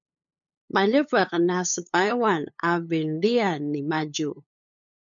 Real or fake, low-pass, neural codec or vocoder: fake; 7.2 kHz; codec, 16 kHz, 8 kbps, FunCodec, trained on LibriTTS, 25 frames a second